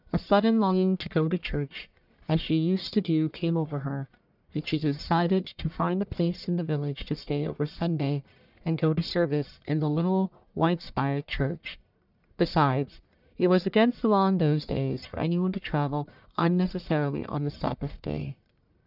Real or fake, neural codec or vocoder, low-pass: fake; codec, 44.1 kHz, 1.7 kbps, Pupu-Codec; 5.4 kHz